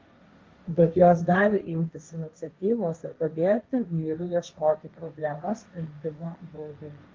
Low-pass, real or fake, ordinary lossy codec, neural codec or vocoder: 7.2 kHz; fake; Opus, 32 kbps; codec, 16 kHz, 1.1 kbps, Voila-Tokenizer